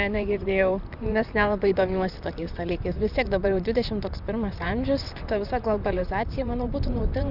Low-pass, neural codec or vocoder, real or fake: 5.4 kHz; vocoder, 44.1 kHz, 128 mel bands, Pupu-Vocoder; fake